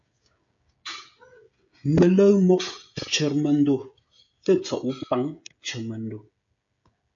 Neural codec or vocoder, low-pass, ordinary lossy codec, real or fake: codec, 16 kHz, 16 kbps, FreqCodec, smaller model; 7.2 kHz; MP3, 48 kbps; fake